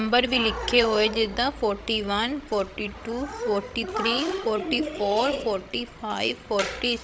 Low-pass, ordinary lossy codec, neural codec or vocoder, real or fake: none; none; codec, 16 kHz, 16 kbps, FreqCodec, larger model; fake